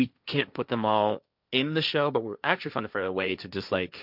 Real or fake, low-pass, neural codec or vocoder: fake; 5.4 kHz; codec, 16 kHz, 1.1 kbps, Voila-Tokenizer